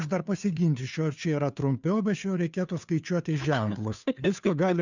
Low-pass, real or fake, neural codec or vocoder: 7.2 kHz; fake; codec, 16 kHz, 2 kbps, FunCodec, trained on Chinese and English, 25 frames a second